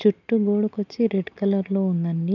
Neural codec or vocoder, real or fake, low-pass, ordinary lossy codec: none; real; 7.2 kHz; none